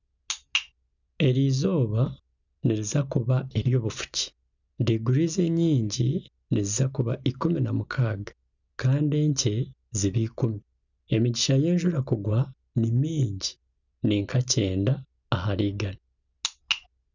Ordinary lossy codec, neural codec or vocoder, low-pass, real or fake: none; none; 7.2 kHz; real